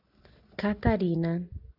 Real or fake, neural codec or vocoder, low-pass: real; none; 5.4 kHz